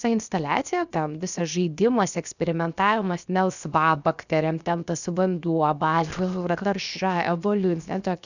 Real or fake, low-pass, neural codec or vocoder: fake; 7.2 kHz; codec, 16 kHz, 0.7 kbps, FocalCodec